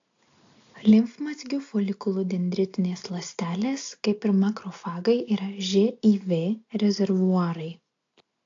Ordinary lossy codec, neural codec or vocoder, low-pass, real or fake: AAC, 48 kbps; none; 7.2 kHz; real